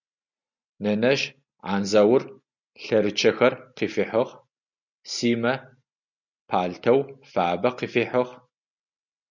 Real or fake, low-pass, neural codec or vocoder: real; 7.2 kHz; none